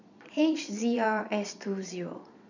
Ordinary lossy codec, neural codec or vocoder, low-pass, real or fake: none; vocoder, 22.05 kHz, 80 mel bands, Vocos; 7.2 kHz; fake